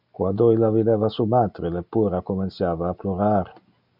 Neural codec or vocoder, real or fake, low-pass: none; real; 5.4 kHz